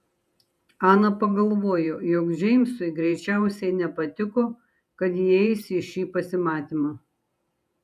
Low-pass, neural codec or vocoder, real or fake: 14.4 kHz; vocoder, 48 kHz, 128 mel bands, Vocos; fake